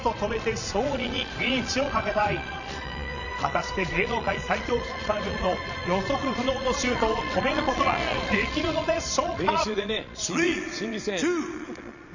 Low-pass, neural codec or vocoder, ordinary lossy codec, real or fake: 7.2 kHz; vocoder, 22.05 kHz, 80 mel bands, Vocos; none; fake